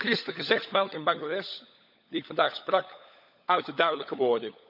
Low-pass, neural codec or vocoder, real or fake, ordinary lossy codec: 5.4 kHz; codec, 16 kHz, 16 kbps, FunCodec, trained on LibriTTS, 50 frames a second; fake; none